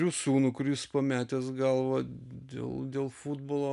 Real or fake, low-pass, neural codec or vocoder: real; 10.8 kHz; none